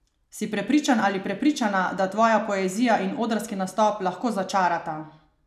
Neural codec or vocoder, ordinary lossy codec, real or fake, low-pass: none; none; real; 14.4 kHz